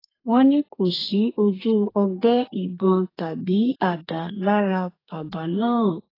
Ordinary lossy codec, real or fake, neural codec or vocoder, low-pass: AAC, 24 kbps; fake; codec, 32 kHz, 1.9 kbps, SNAC; 5.4 kHz